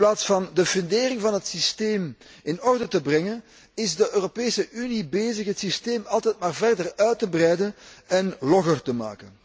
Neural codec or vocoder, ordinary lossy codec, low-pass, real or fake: none; none; none; real